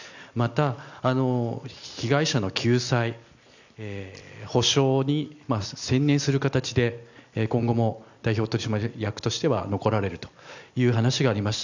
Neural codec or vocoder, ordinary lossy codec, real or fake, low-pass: none; none; real; 7.2 kHz